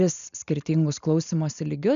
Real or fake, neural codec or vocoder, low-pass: real; none; 7.2 kHz